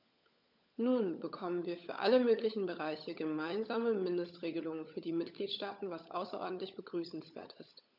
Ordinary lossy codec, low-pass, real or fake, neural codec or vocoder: none; 5.4 kHz; fake; codec, 16 kHz, 16 kbps, FunCodec, trained on LibriTTS, 50 frames a second